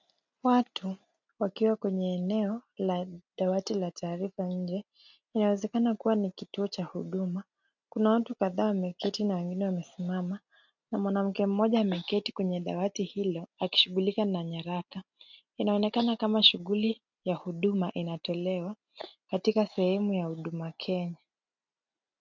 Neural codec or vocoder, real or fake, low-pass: none; real; 7.2 kHz